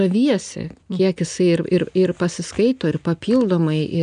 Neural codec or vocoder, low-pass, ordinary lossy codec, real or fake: none; 9.9 kHz; AAC, 96 kbps; real